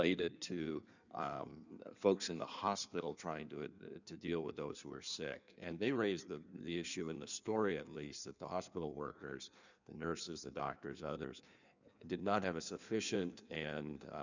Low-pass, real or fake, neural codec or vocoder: 7.2 kHz; fake; codec, 16 kHz in and 24 kHz out, 1.1 kbps, FireRedTTS-2 codec